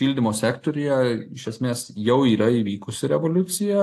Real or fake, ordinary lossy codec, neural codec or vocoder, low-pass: real; AAC, 64 kbps; none; 14.4 kHz